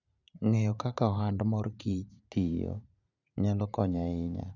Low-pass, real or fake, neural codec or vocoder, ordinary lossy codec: 7.2 kHz; fake; vocoder, 44.1 kHz, 80 mel bands, Vocos; none